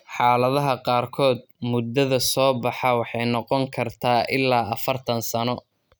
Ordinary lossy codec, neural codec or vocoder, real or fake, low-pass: none; none; real; none